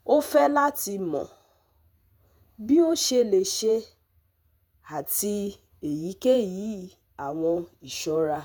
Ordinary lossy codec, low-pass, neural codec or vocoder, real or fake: none; none; vocoder, 48 kHz, 128 mel bands, Vocos; fake